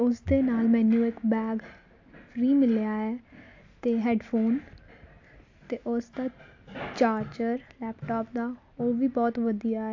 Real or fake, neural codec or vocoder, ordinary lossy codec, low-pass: real; none; AAC, 48 kbps; 7.2 kHz